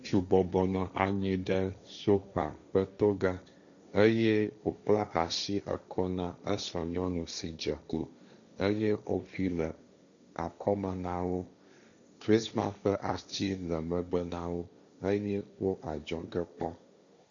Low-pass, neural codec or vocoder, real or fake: 7.2 kHz; codec, 16 kHz, 1.1 kbps, Voila-Tokenizer; fake